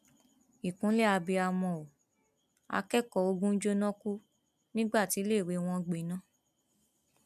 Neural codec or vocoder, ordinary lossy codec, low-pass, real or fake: none; none; 14.4 kHz; real